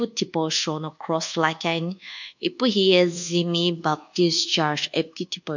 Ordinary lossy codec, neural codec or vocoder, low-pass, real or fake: none; codec, 24 kHz, 1.2 kbps, DualCodec; 7.2 kHz; fake